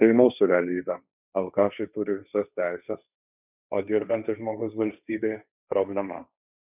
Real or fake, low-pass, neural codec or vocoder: fake; 3.6 kHz; codec, 16 kHz, 1.1 kbps, Voila-Tokenizer